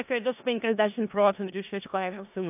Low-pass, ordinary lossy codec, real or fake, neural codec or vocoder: 3.6 kHz; AAC, 32 kbps; fake; codec, 16 kHz in and 24 kHz out, 0.4 kbps, LongCat-Audio-Codec, four codebook decoder